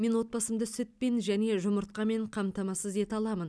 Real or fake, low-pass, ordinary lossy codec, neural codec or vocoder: real; none; none; none